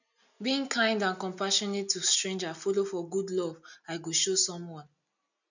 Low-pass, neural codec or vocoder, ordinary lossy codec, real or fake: 7.2 kHz; none; none; real